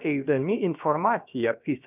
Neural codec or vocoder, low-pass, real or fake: codec, 16 kHz, about 1 kbps, DyCAST, with the encoder's durations; 3.6 kHz; fake